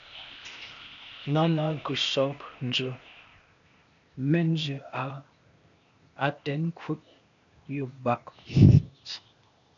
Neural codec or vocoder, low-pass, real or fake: codec, 16 kHz, 0.8 kbps, ZipCodec; 7.2 kHz; fake